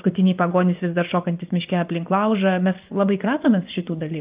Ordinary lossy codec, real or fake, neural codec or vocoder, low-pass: Opus, 24 kbps; fake; vocoder, 24 kHz, 100 mel bands, Vocos; 3.6 kHz